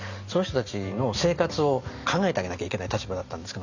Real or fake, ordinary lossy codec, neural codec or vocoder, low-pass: real; none; none; 7.2 kHz